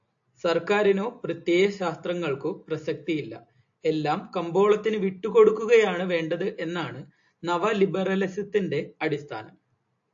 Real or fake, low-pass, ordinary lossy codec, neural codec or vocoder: real; 7.2 kHz; AAC, 64 kbps; none